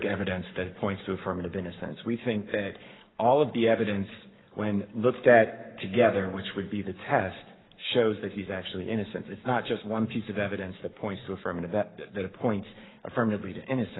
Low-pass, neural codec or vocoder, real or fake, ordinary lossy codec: 7.2 kHz; codec, 44.1 kHz, 7.8 kbps, Pupu-Codec; fake; AAC, 16 kbps